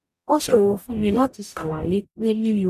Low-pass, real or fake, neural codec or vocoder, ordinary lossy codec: 14.4 kHz; fake; codec, 44.1 kHz, 0.9 kbps, DAC; none